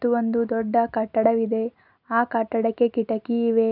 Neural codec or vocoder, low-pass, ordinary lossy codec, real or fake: none; 5.4 kHz; none; real